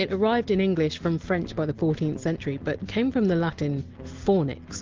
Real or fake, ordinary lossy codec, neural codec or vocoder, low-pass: real; Opus, 16 kbps; none; 7.2 kHz